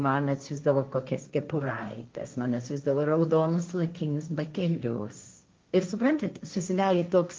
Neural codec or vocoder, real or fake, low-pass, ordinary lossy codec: codec, 16 kHz, 1.1 kbps, Voila-Tokenizer; fake; 7.2 kHz; Opus, 24 kbps